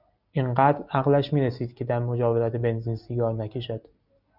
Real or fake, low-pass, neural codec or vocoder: real; 5.4 kHz; none